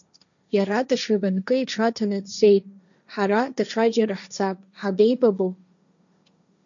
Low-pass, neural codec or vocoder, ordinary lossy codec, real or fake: 7.2 kHz; codec, 16 kHz, 1.1 kbps, Voila-Tokenizer; MP3, 96 kbps; fake